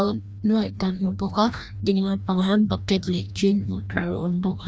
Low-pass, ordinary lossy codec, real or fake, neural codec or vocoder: none; none; fake; codec, 16 kHz, 1 kbps, FreqCodec, larger model